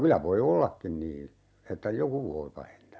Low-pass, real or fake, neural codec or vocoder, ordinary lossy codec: none; real; none; none